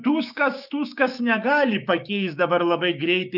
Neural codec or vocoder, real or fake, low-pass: codec, 16 kHz, 6 kbps, DAC; fake; 5.4 kHz